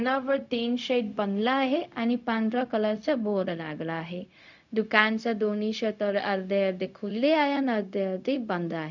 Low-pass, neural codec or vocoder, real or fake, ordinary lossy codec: 7.2 kHz; codec, 16 kHz, 0.4 kbps, LongCat-Audio-Codec; fake; none